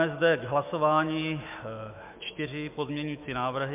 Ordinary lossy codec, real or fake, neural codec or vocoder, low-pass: MP3, 32 kbps; fake; autoencoder, 48 kHz, 128 numbers a frame, DAC-VAE, trained on Japanese speech; 3.6 kHz